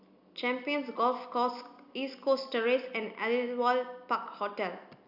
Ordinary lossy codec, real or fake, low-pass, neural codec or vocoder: none; real; 5.4 kHz; none